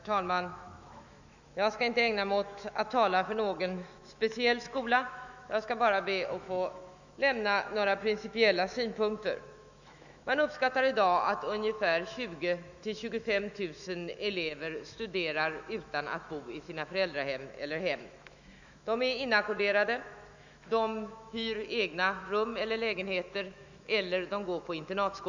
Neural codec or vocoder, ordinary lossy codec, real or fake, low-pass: autoencoder, 48 kHz, 128 numbers a frame, DAC-VAE, trained on Japanese speech; none; fake; 7.2 kHz